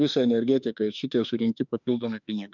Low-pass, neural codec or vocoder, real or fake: 7.2 kHz; autoencoder, 48 kHz, 32 numbers a frame, DAC-VAE, trained on Japanese speech; fake